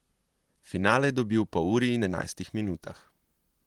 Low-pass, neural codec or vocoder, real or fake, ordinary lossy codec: 19.8 kHz; vocoder, 44.1 kHz, 128 mel bands, Pupu-Vocoder; fake; Opus, 24 kbps